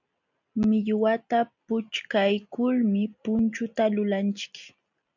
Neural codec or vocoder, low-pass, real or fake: none; 7.2 kHz; real